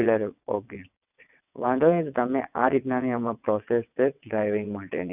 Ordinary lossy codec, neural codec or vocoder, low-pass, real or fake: none; vocoder, 22.05 kHz, 80 mel bands, WaveNeXt; 3.6 kHz; fake